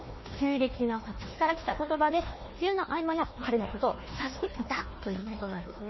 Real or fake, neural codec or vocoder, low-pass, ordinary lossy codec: fake; codec, 16 kHz, 1 kbps, FunCodec, trained on Chinese and English, 50 frames a second; 7.2 kHz; MP3, 24 kbps